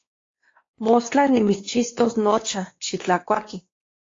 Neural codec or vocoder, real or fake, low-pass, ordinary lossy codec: codec, 16 kHz, 2 kbps, X-Codec, WavLM features, trained on Multilingual LibriSpeech; fake; 7.2 kHz; AAC, 32 kbps